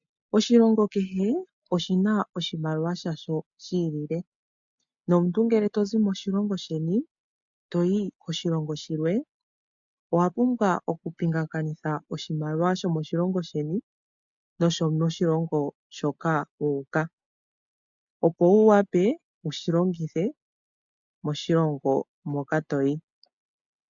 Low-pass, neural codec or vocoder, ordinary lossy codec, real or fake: 7.2 kHz; none; MP3, 64 kbps; real